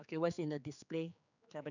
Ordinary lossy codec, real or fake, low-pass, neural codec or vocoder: none; fake; 7.2 kHz; codec, 16 kHz, 4 kbps, X-Codec, HuBERT features, trained on general audio